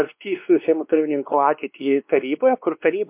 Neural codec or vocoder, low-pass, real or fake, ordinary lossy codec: codec, 16 kHz, 2 kbps, X-Codec, WavLM features, trained on Multilingual LibriSpeech; 3.6 kHz; fake; MP3, 32 kbps